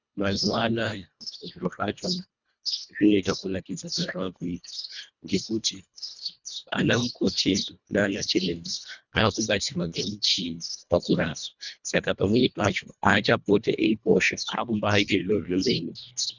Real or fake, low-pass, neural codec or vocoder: fake; 7.2 kHz; codec, 24 kHz, 1.5 kbps, HILCodec